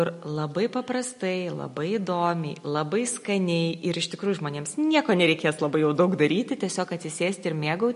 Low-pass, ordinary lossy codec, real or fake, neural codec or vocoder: 10.8 kHz; MP3, 48 kbps; real; none